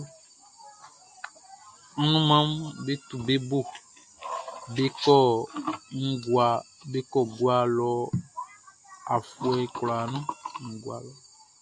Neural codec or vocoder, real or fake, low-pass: none; real; 10.8 kHz